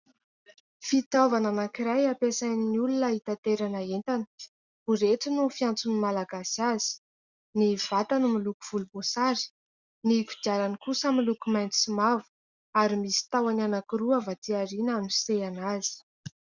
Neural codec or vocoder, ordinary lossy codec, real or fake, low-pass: none; Opus, 64 kbps; real; 7.2 kHz